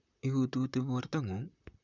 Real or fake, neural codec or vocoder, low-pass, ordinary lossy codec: fake; vocoder, 44.1 kHz, 128 mel bands, Pupu-Vocoder; 7.2 kHz; none